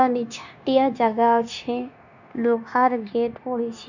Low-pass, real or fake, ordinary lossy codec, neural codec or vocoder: 7.2 kHz; fake; none; codec, 16 kHz, 0.9 kbps, LongCat-Audio-Codec